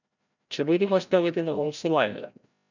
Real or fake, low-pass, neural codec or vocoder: fake; 7.2 kHz; codec, 16 kHz, 0.5 kbps, FreqCodec, larger model